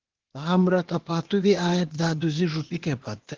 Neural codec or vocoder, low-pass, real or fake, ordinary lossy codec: codec, 16 kHz, 0.8 kbps, ZipCodec; 7.2 kHz; fake; Opus, 16 kbps